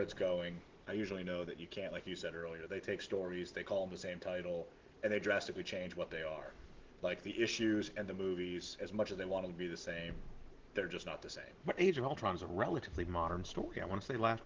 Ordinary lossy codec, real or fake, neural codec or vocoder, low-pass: Opus, 16 kbps; real; none; 7.2 kHz